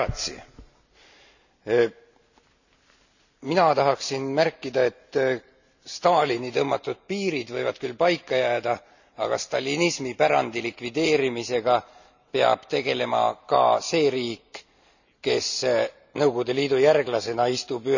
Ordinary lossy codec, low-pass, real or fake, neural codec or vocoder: none; 7.2 kHz; real; none